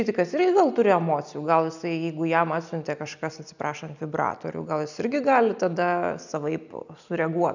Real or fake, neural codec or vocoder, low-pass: real; none; 7.2 kHz